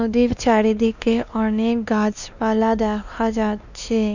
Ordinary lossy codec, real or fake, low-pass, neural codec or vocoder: none; fake; 7.2 kHz; codec, 16 kHz, 2 kbps, X-Codec, WavLM features, trained on Multilingual LibriSpeech